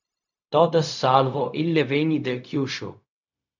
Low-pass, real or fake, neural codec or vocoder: 7.2 kHz; fake; codec, 16 kHz, 0.4 kbps, LongCat-Audio-Codec